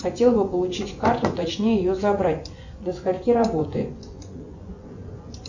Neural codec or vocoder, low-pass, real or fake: vocoder, 24 kHz, 100 mel bands, Vocos; 7.2 kHz; fake